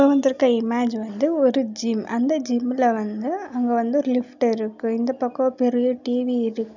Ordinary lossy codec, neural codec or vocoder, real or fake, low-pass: none; none; real; 7.2 kHz